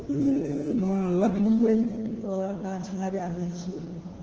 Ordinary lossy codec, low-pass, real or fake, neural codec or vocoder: Opus, 16 kbps; 7.2 kHz; fake; codec, 16 kHz, 1 kbps, FunCodec, trained on LibriTTS, 50 frames a second